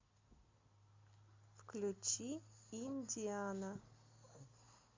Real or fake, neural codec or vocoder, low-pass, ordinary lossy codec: real; none; 7.2 kHz; MP3, 64 kbps